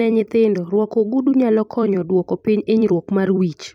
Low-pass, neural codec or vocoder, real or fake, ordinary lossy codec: 19.8 kHz; vocoder, 44.1 kHz, 128 mel bands every 256 samples, BigVGAN v2; fake; none